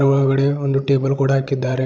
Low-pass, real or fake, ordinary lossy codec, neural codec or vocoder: none; fake; none; codec, 16 kHz, 16 kbps, FreqCodec, larger model